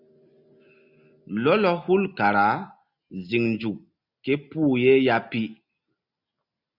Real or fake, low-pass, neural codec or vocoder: real; 5.4 kHz; none